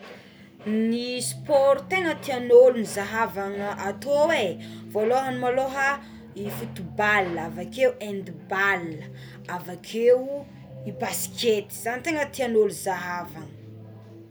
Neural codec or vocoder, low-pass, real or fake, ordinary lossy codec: none; none; real; none